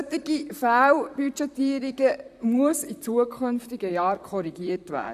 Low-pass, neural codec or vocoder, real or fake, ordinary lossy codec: 14.4 kHz; vocoder, 44.1 kHz, 128 mel bands, Pupu-Vocoder; fake; none